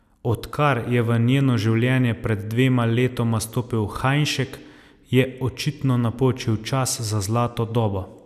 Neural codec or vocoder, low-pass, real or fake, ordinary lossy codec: none; 14.4 kHz; real; none